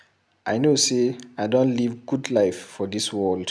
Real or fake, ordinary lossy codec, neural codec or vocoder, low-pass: real; none; none; none